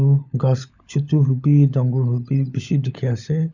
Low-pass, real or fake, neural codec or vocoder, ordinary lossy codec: 7.2 kHz; fake; codec, 16 kHz, 16 kbps, FunCodec, trained on LibriTTS, 50 frames a second; none